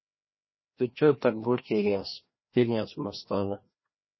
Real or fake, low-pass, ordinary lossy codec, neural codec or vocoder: fake; 7.2 kHz; MP3, 24 kbps; codec, 16 kHz, 1 kbps, FreqCodec, larger model